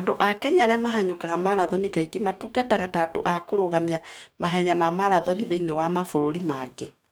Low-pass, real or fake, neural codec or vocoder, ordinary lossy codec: none; fake; codec, 44.1 kHz, 2.6 kbps, DAC; none